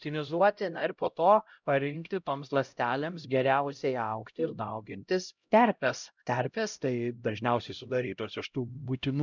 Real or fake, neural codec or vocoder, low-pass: fake; codec, 16 kHz, 0.5 kbps, X-Codec, HuBERT features, trained on LibriSpeech; 7.2 kHz